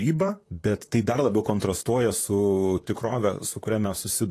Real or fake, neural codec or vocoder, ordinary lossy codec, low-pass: fake; vocoder, 44.1 kHz, 128 mel bands, Pupu-Vocoder; AAC, 48 kbps; 14.4 kHz